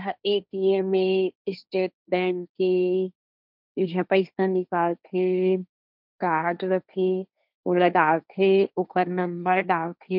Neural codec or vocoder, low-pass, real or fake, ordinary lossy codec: codec, 16 kHz, 1.1 kbps, Voila-Tokenizer; 5.4 kHz; fake; none